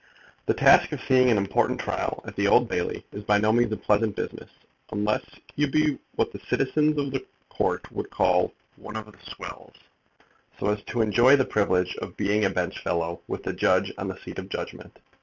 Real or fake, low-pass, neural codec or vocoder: fake; 7.2 kHz; vocoder, 44.1 kHz, 128 mel bands every 256 samples, BigVGAN v2